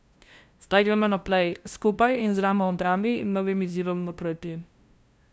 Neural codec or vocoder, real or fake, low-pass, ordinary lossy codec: codec, 16 kHz, 0.5 kbps, FunCodec, trained on LibriTTS, 25 frames a second; fake; none; none